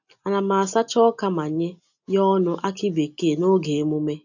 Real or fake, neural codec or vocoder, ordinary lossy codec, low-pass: real; none; none; 7.2 kHz